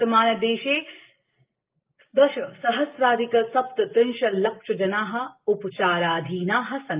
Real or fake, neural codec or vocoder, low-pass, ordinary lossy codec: real; none; 3.6 kHz; Opus, 24 kbps